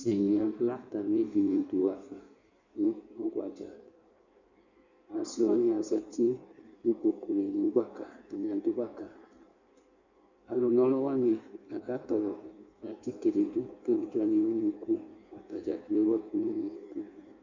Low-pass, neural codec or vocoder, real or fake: 7.2 kHz; codec, 16 kHz in and 24 kHz out, 1.1 kbps, FireRedTTS-2 codec; fake